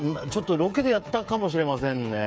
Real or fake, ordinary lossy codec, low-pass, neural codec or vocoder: fake; none; none; codec, 16 kHz, 8 kbps, FreqCodec, smaller model